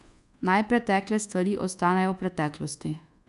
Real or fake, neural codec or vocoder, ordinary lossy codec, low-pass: fake; codec, 24 kHz, 1.2 kbps, DualCodec; none; 10.8 kHz